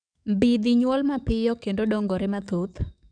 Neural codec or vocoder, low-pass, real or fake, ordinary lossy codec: codec, 44.1 kHz, 7.8 kbps, DAC; 9.9 kHz; fake; none